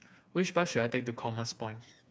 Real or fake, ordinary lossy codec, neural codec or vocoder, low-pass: fake; none; codec, 16 kHz, 4 kbps, FreqCodec, smaller model; none